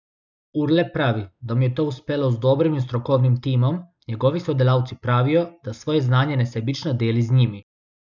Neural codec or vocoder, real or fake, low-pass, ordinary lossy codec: none; real; 7.2 kHz; none